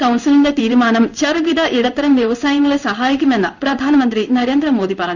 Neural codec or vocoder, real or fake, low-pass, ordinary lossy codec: codec, 16 kHz in and 24 kHz out, 1 kbps, XY-Tokenizer; fake; 7.2 kHz; none